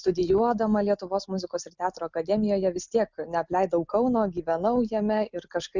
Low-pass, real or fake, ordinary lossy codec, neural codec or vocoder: 7.2 kHz; real; Opus, 64 kbps; none